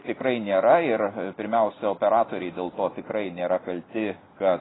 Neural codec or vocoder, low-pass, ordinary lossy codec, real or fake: none; 7.2 kHz; AAC, 16 kbps; real